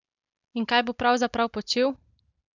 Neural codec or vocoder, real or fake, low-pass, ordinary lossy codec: none; real; 7.2 kHz; none